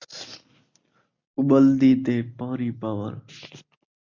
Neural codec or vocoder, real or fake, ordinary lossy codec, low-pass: none; real; MP3, 64 kbps; 7.2 kHz